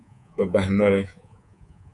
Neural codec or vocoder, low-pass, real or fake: codec, 24 kHz, 3.1 kbps, DualCodec; 10.8 kHz; fake